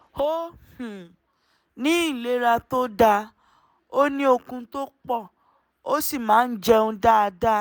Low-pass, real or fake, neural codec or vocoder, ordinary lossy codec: none; real; none; none